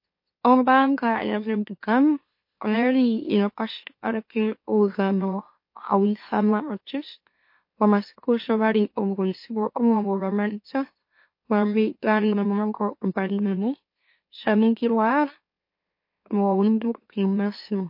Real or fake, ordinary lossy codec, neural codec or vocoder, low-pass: fake; MP3, 32 kbps; autoencoder, 44.1 kHz, a latent of 192 numbers a frame, MeloTTS; 5.4 kHz